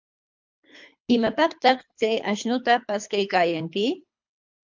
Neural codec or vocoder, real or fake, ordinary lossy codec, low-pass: codec, 24 kHz, 3 kbps, HILCodec; fake; MP3, 64 kbps; 7.2 kHz